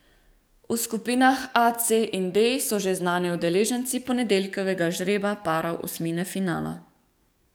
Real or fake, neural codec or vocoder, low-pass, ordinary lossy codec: fake; codec, 44.1 kHz, 7.8 kbps, DAC; none; none